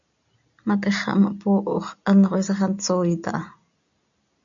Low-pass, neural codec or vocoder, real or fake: 7.2 kHz; none; real